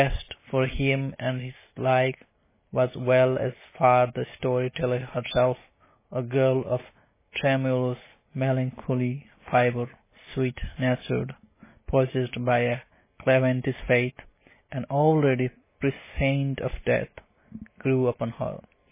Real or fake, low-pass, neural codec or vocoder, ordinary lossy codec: real; 3.6 kHz; none; MP3, 16 kbps